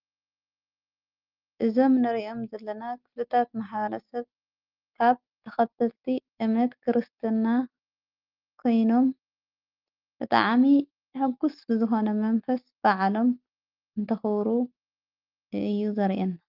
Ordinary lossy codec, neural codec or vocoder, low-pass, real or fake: Opus, 24 kbps; none; 5.4 kHz; real